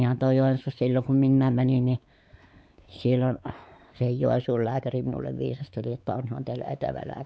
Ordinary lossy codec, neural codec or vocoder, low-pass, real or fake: none; codec, 16 kHz, 4 kbps, X-Codec, WavLM features, trained on Multilingual LibriSpeech; none; fake